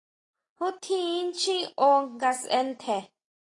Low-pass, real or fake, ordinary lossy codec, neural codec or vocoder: 10.8 kHz; real; AAC, 32 kbps; none